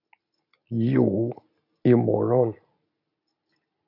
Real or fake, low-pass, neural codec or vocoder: real; 5.4 kHz; none